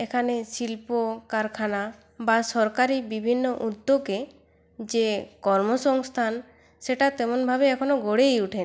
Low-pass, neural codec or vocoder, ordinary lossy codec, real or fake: none; none; none; real